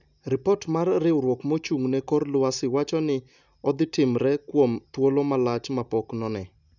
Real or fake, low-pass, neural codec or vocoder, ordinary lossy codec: real; 7.2 kHz; none; none